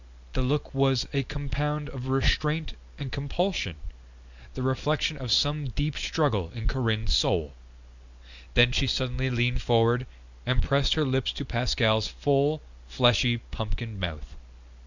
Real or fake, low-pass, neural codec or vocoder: real; 7.2 kHz; none